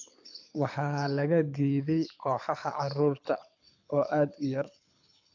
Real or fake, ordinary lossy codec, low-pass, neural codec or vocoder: fake; AAC, 48 kbps; 7.2 kHz; codec, 24 kHz, 6 kbps, HILCodec